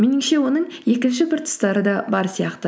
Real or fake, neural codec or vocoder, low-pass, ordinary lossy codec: real; none; none; none